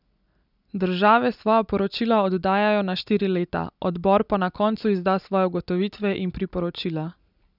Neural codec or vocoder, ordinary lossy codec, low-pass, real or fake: none; none; 5.4 kHz; real